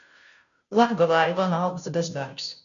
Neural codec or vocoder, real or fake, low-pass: codec, 16 kHz, 0.5 kbps, FunCodec, trained on Chinese and English, 25 frames a second; fake; 7.2 kHz